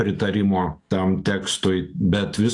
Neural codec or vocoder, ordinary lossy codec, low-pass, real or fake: autoencoder, 48 kHz, 128 numbers a frame, DAC-VAE, trained on Japanese speech; AAC, 48 kbps; 10.8 kHz; fake